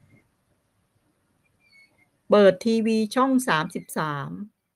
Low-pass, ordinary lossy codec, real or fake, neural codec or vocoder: 14.4 kHz; Opus, 32 kbps; real; none